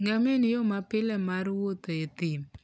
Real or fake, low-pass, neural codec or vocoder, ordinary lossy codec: real; none; none; none